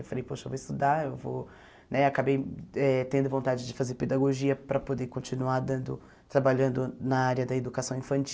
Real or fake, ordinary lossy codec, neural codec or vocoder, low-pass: real; none; none; none